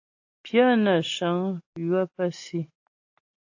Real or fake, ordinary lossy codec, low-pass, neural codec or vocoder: real; AAC, 48 kbps; 7.2 kHz; none